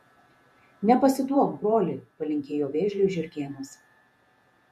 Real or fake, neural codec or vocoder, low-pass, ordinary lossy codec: fake; vocoder, 48 kHz, 128 mel bands, Vocos; 14.4 kHz; MP3, 64 kbps